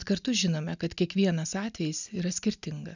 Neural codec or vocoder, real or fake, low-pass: none; real; 7.2 kHz